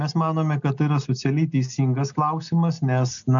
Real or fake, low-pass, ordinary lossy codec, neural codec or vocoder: real; 7.2 kHz; MP3, 96 kbps; none